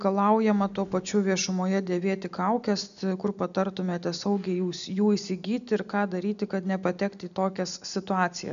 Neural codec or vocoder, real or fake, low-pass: none; real; 7.2 kHz